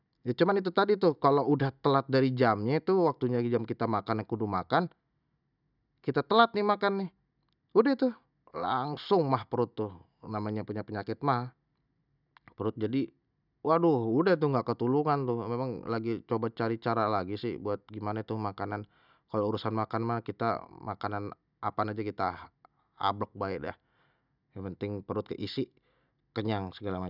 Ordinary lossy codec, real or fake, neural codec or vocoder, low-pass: none; real; none; 5.4 kHz